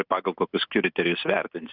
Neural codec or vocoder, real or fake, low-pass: none; real; 5.4 kHz